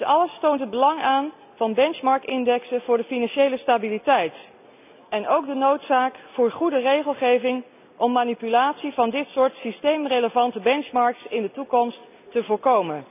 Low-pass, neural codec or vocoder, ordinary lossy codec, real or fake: 3.6 kHz; none; none; real